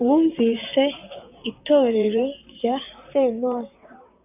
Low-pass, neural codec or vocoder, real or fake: 3.6 kHz; vocoder, 44.1 kHz, 128 mel bands, Pupu-Vocoder; fake